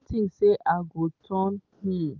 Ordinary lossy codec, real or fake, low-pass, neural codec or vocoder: Opus, 32 kbps; real; 7.2 kHz; none